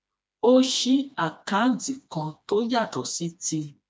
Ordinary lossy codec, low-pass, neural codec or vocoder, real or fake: none; none; codec, 16 kHz, 2 kbps, FreqCodec, smaller model; fake